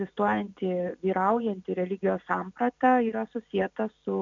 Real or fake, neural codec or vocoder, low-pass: real; none; 7.2 kHz